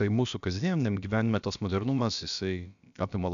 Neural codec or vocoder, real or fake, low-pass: codec, 16 kHz, about 1 kbps, DyCAST, with the encoder's durations; fake; 7.2 kHz